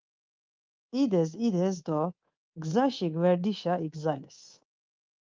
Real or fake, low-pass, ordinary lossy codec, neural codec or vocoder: fake; 7.2 kHz; Opus, 32 kbps; codec, 24 kHz, 3.1 kbps, DualCodec